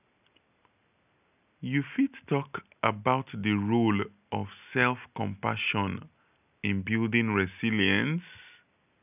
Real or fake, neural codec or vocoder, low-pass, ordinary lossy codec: real; none; 3.6 kHz; none